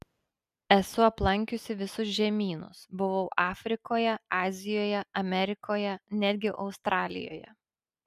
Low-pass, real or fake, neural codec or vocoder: 14.4 kHz; real; none